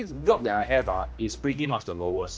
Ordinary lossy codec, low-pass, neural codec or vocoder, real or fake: none; none; codec, 16 kHz, 1 kbps, X-Codec, HuBERT features, trained on general audio; fake